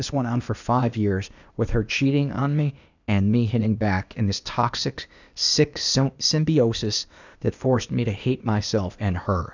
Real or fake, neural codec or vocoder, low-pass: fake; codec, 16 kHz, 0.8 kbps, ZipCodec; 7.2 kHz